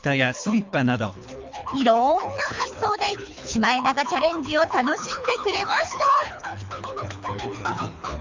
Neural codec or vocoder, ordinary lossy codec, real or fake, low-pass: codec, 24 kHz, 3 kbps, HILCodec; MP3, 64 kbps; fake; 7.2 kHz